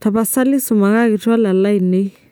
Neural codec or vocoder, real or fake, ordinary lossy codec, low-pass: none; real; none; none